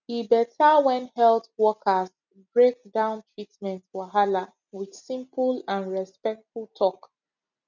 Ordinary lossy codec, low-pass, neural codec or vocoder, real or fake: none; 7.2 kHz; none; real